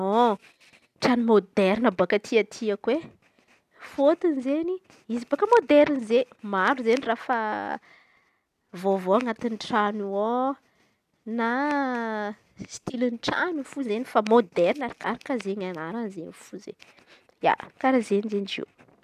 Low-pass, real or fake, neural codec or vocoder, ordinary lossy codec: 14.4 kHz; real; none; none